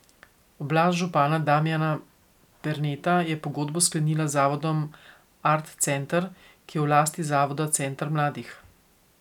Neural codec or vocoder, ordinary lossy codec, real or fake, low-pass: none; none; real; 19.8 kHz